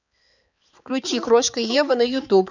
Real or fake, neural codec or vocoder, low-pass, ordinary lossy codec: fake; codec, 16 kHz, 4 kbps, X-Codec, HuBERT features, trained on balanced general audio; 7.2 kHz; none